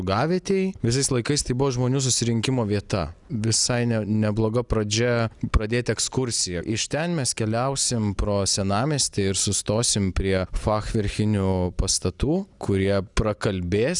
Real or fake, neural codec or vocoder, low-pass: real; none; 10.8 kHz